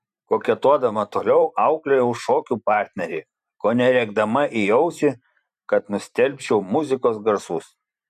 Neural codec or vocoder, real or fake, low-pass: vocoder, 44.1 kHz, 128 mel bands every 512 samples, BigVGAN v2; fake; 14.4 kHz